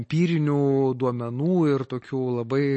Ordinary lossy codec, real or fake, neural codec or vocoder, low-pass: MP3, 32 kbps; real; none; 10.8 kHz